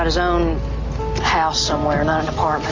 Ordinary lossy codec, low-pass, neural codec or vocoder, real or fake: AAC, 48 kbps; 7.2 kHz; none; real